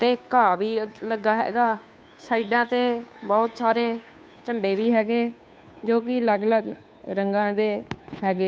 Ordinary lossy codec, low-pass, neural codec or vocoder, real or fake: none; none; codec, 16 kHz, 2 kbps, FunCodec, trained on Chinese and English, 25 frames a second; fake